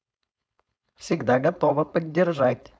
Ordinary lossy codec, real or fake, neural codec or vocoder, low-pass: none; fake; codec, 16 kHz, 4.8 kbps, FACodec; none